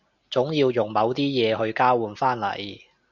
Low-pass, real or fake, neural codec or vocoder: 7.2 kHz; real; none